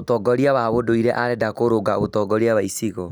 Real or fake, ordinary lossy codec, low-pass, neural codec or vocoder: real; none; none; none